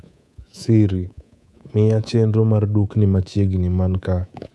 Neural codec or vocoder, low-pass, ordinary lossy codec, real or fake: codec, 24 kHz, 3.1 kbps, DualCodec; none; none; fake